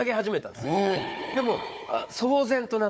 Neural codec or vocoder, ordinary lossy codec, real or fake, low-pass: codec, 16 kHz, 4 kbps, FunCodec, trained on Chinese and English, 50 frames a second; none; fake; none